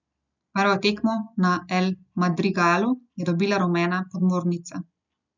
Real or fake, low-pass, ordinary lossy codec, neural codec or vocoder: real; 7.2 kHz; none; none